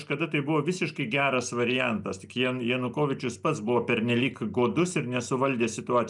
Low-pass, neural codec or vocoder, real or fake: 10.8 kHz; none; real